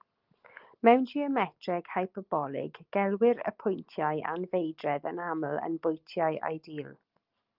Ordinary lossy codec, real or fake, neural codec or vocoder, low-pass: Opus, 32 kbps; real; none; 5.4 kHz